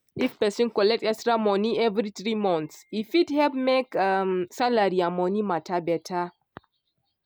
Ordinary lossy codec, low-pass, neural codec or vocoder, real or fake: none; none; none; real